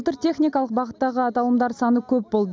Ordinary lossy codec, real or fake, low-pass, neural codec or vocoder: none; real; none; none